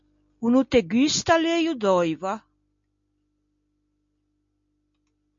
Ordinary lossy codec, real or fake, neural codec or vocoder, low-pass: MP3, 48 kbps; real; none; 7.2 kHz